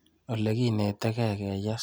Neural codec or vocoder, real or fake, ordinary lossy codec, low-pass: none; real; none; none